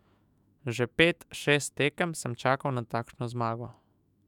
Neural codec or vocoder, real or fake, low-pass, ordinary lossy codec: autoencoder, 48 kHz, 128 numbers a frame, DAC-VAE, trained on Japanese speech; fake; 19.8 kHz; none